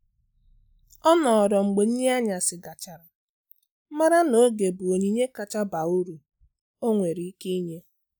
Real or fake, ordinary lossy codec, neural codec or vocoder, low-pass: real; none; none; none